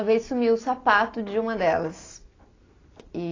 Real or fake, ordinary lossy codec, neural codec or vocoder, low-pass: real; AAC, 32 kbps; none; 7.2 kHz